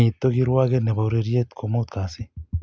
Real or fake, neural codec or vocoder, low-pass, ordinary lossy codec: real; none; none; none